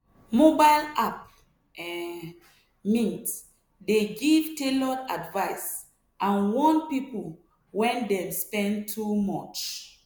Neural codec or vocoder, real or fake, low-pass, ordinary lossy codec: none; real; none; none